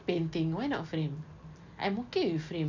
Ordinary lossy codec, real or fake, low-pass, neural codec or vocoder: none; real; 7.2 kHz; none